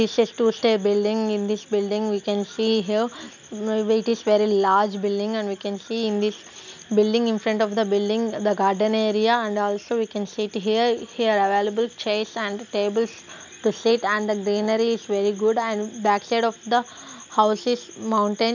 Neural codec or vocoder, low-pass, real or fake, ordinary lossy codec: none; 7.2 kHz; real; none